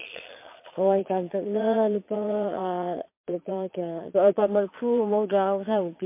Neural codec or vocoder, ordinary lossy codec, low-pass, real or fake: vocoder, 22.05 kHz, 80 mel bands, Vocos; MP3, 24 kbps; 3.6 kHz; fake